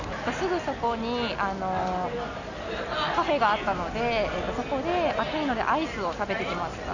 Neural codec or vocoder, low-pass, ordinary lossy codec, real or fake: none; 7.2 kHz; none; real